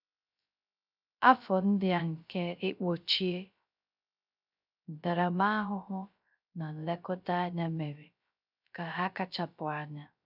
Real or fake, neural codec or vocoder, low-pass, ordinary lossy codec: fake; codec, 16 kHz, 0.3 kbps, FocalCodec; 5.4 kHz; none